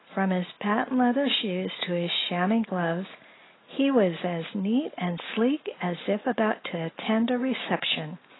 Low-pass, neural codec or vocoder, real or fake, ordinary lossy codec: 7.2 kHz; none; real; AAC, 16 kbps